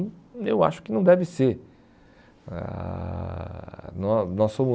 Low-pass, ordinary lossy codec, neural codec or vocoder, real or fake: none; none; none; real